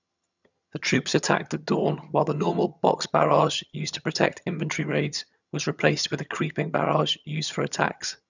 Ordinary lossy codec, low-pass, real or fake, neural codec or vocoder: none; 7.2 kHz; fake; vocoder, 22.05 kHz, 80 mel bands, HiFi-GAN